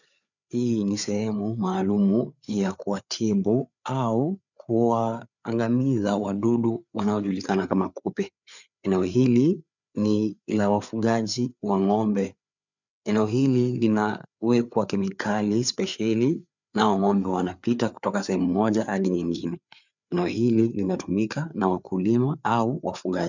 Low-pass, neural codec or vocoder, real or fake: 7.2 kHz; codec, 16 kHz, 4 kbps, FreqCodec, larger model; fake